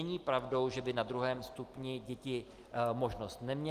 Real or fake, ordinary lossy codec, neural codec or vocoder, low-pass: real; Opus, 24 kbps; none; 14.4 kHz